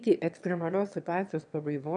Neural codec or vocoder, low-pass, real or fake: autoencoder, 22.05 kHz, a latent of 192 numbers a frame, VITS, trained on one speaker; 9.9 kHz; fake